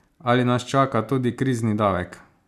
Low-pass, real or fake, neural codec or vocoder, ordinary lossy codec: 14.4 kHz; real; none; none